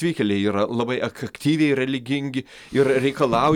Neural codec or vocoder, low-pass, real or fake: none; 19.8 kHz; real